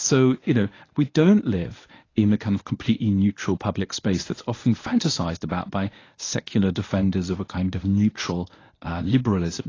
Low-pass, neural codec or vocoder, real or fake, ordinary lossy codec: 7.2 kHz; codec, 24 kHz, 0.9 kbps, WavTokenizer, medium speech release version 1; fake; AAC, 32 kbps